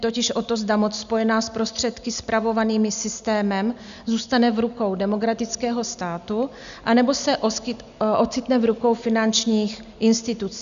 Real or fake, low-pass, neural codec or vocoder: real; 7.2 kHz; none